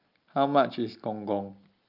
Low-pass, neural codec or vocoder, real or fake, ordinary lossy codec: 5.4 kHz; none; real; Opus, 24 kbps